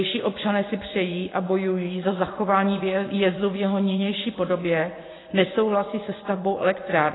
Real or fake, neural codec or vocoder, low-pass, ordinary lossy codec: fake; vocoder, 24 kHz, 100 mel bands, Vocos; 7.2 kHz; AAC, 16 kbps